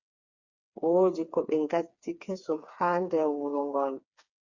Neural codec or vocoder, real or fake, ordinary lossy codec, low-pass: codec, 16 kHz, 4 kbps, FreqCodec, smaller model; fake; Opus, 64 kbps; 7.2 kHz